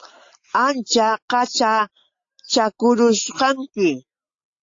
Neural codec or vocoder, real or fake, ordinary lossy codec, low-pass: none; real; AAC, 48 kbps; 7.2 kHz